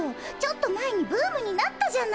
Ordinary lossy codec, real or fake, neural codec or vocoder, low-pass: none; real; none; none